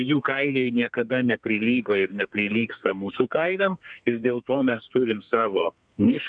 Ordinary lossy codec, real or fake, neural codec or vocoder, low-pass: MP3, 96 kbps; fake; codec, 32 kHz, 1.9 kbps, SNAC; 9.9 kHz